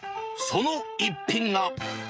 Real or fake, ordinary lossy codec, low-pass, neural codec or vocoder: fake; none; none; codec, 16 kHz, 16 kbps, FreqCodec, smaller model